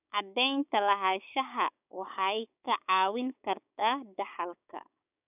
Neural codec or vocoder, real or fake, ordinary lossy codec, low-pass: codec, 16 kHz, 6 kbps, DAC; fake; none; 3.6 kHz